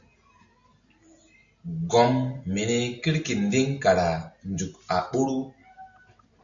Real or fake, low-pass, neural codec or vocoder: real; 7.2 kHz; none